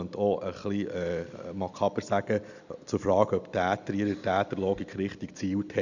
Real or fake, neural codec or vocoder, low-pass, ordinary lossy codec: real; none; 7.2 kHz; none